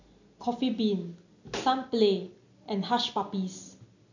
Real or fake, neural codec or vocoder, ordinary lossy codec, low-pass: real; none; none; 7.2 kHz